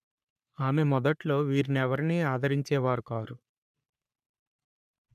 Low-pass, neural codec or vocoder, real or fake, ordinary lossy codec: 14.4 kHz; codec, 44.1 kHz, 3.4 kbps, Pupu-Codec; fake; none